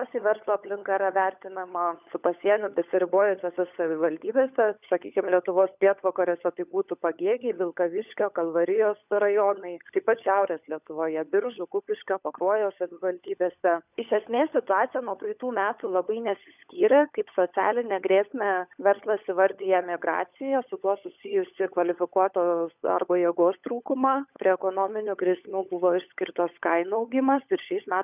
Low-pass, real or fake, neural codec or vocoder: 3.6 kHz; fake; codec, 16 kHz, 16 kbps, FunCodec, trained on LibriTTS, 50 frames a second